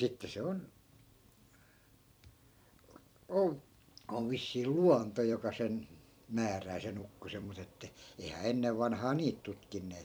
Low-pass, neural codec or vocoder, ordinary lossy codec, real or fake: none; vocoder, 44.1 kHz, 128 mel bands every 256 samples, BigVGAN v2; none; fake